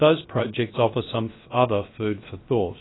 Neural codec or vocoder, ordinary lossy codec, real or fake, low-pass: codec, 16 kHz, about 1 kbps, DyCAST, with the encoder's durations; AAC, 16 kbps; fake; 7.2 kHz